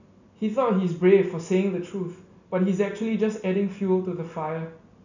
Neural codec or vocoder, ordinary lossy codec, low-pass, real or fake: none; none; 7.2 kHz; real